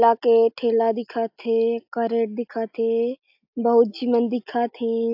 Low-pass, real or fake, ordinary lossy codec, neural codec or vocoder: 5.4 kHz; real; AAC, 48 kbps; none